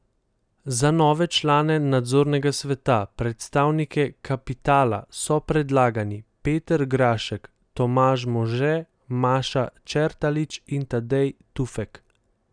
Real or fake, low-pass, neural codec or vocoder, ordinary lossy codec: real; 9.9 kHz; none; none